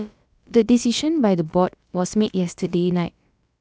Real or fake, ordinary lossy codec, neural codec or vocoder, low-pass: fake; none; codec, 16 kHz, about 1 kbps, DyCAST, with the encoder's durations; none